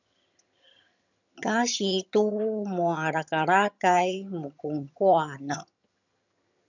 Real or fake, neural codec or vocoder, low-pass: fake; vocoder, 22.05 kHz, 80 mel bands, HiFi-GAN; 7.2 kHz